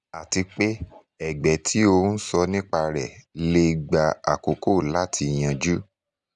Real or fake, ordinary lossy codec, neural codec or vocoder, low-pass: real; none; none; 10.8 kHz